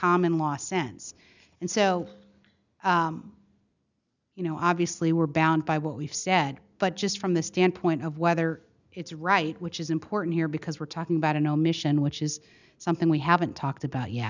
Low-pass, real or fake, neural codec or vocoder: 7.2 kHz; real; none